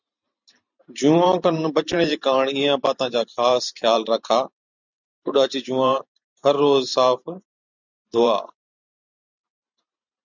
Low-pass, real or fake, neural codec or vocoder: 7.2 kHz; fake; vocoder, 44.1 kHz, 128 mel bands every 512 samples, BigVGAN v2